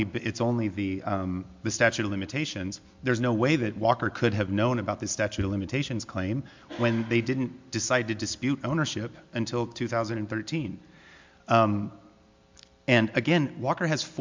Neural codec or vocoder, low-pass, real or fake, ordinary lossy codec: none; 7.2 kHz; real; MP3, 64 kbps